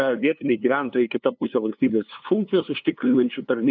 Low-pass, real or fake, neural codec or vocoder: 7.2 kHz; fake; codec, 16 kHz, 2 kbps, FunCodec, trained on LibriTTS, 25 frames a second